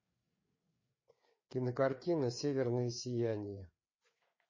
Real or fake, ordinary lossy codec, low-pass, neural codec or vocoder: fake; MP3, 32 kbps; 7.2 kHz; codec, 16 kHz, 4 kbps, FreqCodec, larger model